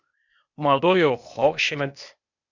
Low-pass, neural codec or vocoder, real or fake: 7.2 kHz; codec, 16 kHz, 0.8 kbps, ZipCodec; fake